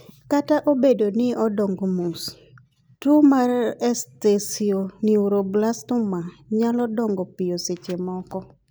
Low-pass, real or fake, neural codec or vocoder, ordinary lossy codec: none; real; none; none